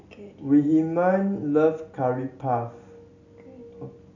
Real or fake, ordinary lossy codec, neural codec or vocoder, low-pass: real; none; none; 7.2 kHz